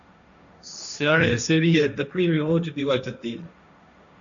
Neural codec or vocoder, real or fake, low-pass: codec, 16 kHz, 1.1 kbps, Voila-Tokenizer; fake; 7.2 kHz